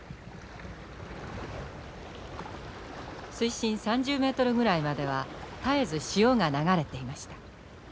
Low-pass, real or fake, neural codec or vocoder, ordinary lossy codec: none; real; none; none